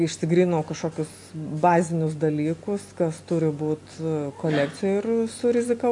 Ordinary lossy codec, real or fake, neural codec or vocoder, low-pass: AAC, 64 kbps; real; none; 10.8 kHz